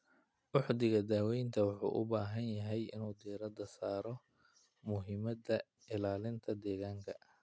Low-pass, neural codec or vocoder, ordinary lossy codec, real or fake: none; none; none; real